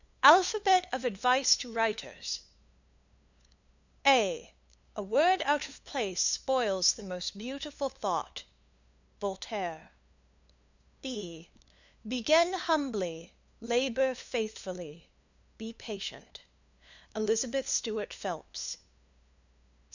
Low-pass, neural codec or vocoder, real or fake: 7.2 kHz; codec, 16 kHz, 2 kbps, FunCodec, trained on LibriTTS, 25 frames a second; fake